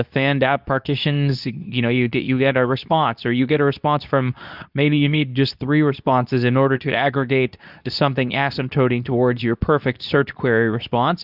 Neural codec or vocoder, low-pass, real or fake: codec, 24 kHz, 0.9 kbps, WavTokenizer, medium speech release version 2; 5.4 kHz; fake